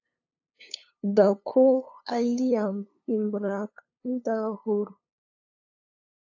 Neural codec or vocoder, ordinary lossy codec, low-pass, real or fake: codec, 16 kHz, 2 kbps, FunCodec, trained on LibriTTS, 25 frames a second; AAC, 48 kbps; 7.2 kHz; fake